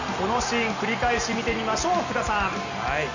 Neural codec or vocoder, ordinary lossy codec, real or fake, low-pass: none; none; real; 7.2 kHz